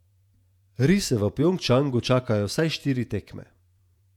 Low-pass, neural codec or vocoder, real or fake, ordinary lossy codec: 19.8 kHz; vocoder, 48 kHz, 128 mel bands, Vocos; fake; none